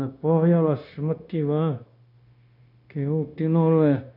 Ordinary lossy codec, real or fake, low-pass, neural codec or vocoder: none; fake; 5.4 kHz; codec, 16 kHz, 0.9 kbps, LongCat-Audio-Codec